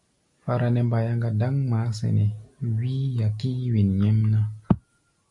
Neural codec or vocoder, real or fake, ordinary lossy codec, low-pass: none; real; MP3, 48 kbps; 10.8 kHz